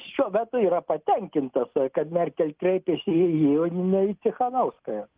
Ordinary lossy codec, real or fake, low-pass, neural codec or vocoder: Opus, 32 kbps; real; 3.6 kHz; none